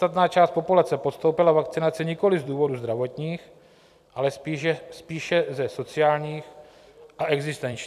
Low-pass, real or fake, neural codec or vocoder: 14.4 kHz; fake; vocoder, 44.1 kHz, 128 mel bands every 256 samples, BigVGAN v2